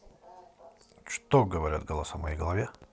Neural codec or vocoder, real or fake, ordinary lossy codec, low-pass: none; real; none; none